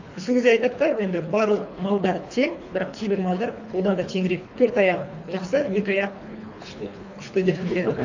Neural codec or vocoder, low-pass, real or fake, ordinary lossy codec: codec, 24 kHz, 3 kbps, HILCodec; 7.2 kHz; fake; AAC, 48 kbps